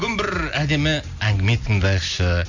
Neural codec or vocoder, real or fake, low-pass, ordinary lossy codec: none; real; 7.2 kHz; none